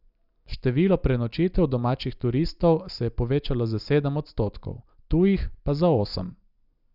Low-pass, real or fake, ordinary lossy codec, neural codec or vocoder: 5.4 kHz; real; none; none